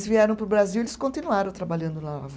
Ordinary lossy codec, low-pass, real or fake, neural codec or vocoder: none; none; real; none